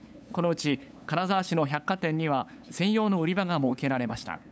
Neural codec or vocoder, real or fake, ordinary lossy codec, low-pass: codec, 16 kHz, 8 kbps, FunCodec, trained on LibriTTS, 25 frames a second; fake; none; none